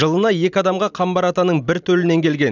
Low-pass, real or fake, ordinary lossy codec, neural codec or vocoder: 7.2 kHz; real; none; none